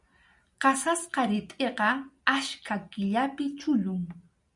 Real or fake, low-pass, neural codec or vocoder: real; 10.8 kHz; none